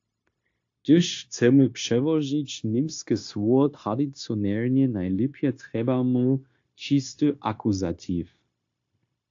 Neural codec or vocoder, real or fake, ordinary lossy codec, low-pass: codec, 16 kHz, 0.9 kbps, LongCat-Audio-Codec; fake; AAC, 48 kbps; 7.2 kHz